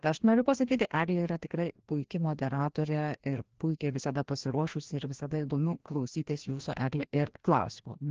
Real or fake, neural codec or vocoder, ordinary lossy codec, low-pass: fake; codec, 16 kHz, 1 kbps, FreqCodec, larger model; Opus, 16 kbps; 7.2 kHz